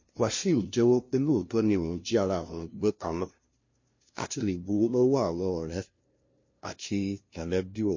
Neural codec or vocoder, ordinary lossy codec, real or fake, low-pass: codec, 16 kHz, 0.5 kbps, FunCodec, trained on LibriTTS, 25 frames a second; MP3, 32 kbps; fake; 7.2 kHz